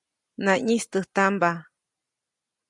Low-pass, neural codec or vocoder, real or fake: 10.8 kHz; none; real